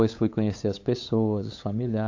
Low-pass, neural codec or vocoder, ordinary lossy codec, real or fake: 7.2 kHz; codec, 16 kHz, 8 kbps, FunCodec, trained on LibriTTS, 25 frames a second; AAC, 48 kbps; fake